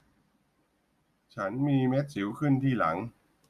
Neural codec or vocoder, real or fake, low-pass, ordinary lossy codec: none; real; 14.4 kHz; Opus, 64 kbps